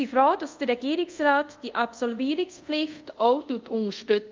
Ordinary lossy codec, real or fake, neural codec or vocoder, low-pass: Opus, 24 kbps; fake; codec, 24 kHz, 0.5 kbps, DualCodec; 7.2 kHz